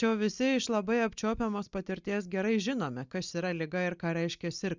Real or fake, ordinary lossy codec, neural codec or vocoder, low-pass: real; Opus, 64 kbps; none; 7.2 kHz